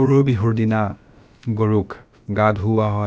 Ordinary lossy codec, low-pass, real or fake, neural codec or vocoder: none; none; fake; codec, 16 kHz, about 1 kbps, DyCAST, with the encoder's durations